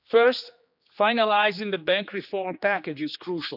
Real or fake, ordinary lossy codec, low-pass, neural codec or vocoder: fake; none; 5.4 kHz; codec, 16 kHz, 2 kbps, X-Codec, HuBERT features, trained on general audio